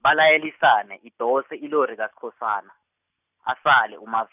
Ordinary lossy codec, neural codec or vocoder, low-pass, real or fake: none; none; 3.6 kHz; real